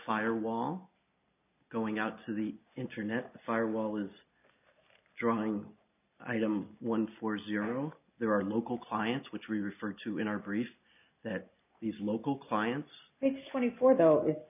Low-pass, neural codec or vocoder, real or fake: 3.6 kHz; none; real